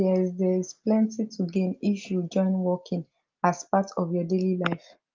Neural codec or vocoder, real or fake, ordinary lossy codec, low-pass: none; real; Opus, 24 kbps; 7.2 kHz